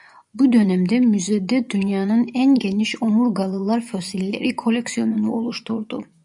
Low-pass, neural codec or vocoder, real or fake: 10.8 kHz; vocoder, 24 kHz, 100 mel bands, Vocos; fake